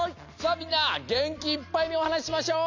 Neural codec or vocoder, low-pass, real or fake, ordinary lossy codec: none; 7.2 kHz; real; AAC, 48 kbps